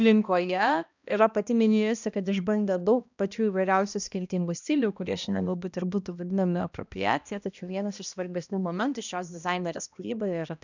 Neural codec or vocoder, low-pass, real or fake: codec, 16 kHz, 1 kbps, X-Codec, HuBERT features, trained on balanced general audio; 7.2 kHz; fake